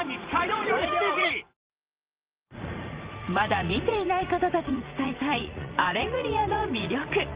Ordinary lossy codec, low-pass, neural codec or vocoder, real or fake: Opus, 32 kbps; 3.6 kHz; vocoder, 44.1 kHz, 80 mel bands, Vocos; fake